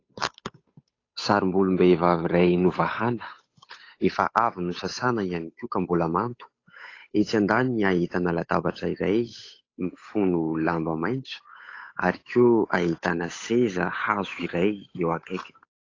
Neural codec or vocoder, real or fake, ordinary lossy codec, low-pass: codec, 16 kHz, 8 kbps, FunCodec, trained on Chinese and English, 25 frames a second; fake; AAC, 32 kbps; 7.2 kHz